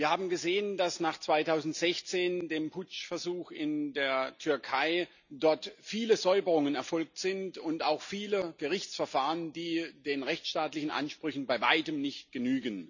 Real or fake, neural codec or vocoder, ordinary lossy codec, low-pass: real; none; none; 7.2 kHz